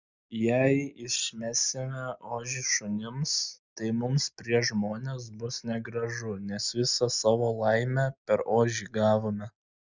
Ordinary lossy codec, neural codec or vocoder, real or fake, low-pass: Opus, 64 kbps; none; real; 7.2 kHz